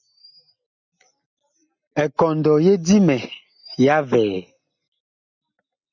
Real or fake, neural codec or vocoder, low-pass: real; none; 7.2 kHz